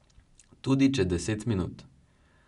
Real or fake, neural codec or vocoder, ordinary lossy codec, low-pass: real; none; none; 10.8 kHz